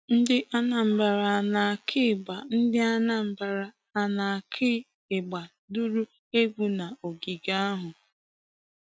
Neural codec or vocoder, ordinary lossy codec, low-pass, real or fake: none; none; none; real